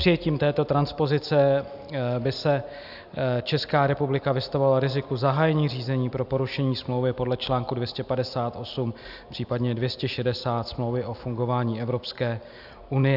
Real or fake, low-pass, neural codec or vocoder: real; 5.4 kHz; none